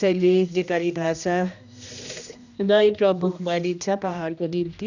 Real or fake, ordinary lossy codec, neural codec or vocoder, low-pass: fake; none; codec, 16 kHz, 1 kbps, X-Codec, HuBERT features, trained on general audio; 7.2 kHz